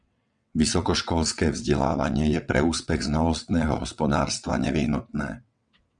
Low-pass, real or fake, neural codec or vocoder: 9.9 kHz; fake; vocoder, 22.05 kHz, 80 mel bands, WaveNeXt